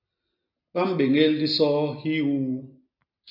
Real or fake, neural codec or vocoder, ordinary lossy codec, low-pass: real; none; AAC, 48 kbps; 5.4 kHz